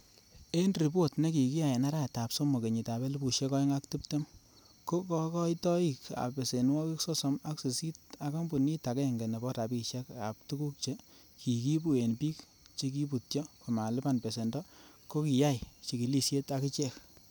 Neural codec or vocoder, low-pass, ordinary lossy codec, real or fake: none; none; none; real